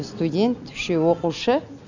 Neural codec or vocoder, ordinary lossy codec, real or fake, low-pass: none; none; real; 7.2 kHz